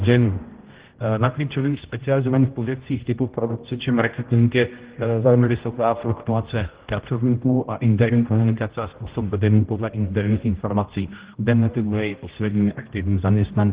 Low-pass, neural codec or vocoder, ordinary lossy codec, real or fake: 3.6 kHz; codec, 16 kHz, 0.5 kbps, X-Codec, HuBERT features, trained on general audio; Opus, 16 kbps; fake